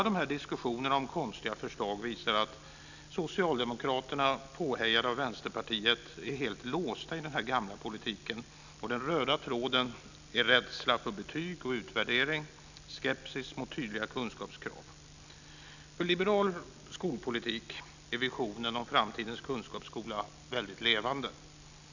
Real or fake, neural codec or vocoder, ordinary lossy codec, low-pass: real; none; none; 7.2 kHz